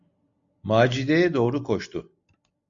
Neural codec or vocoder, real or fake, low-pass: none; real; 7.2 kHz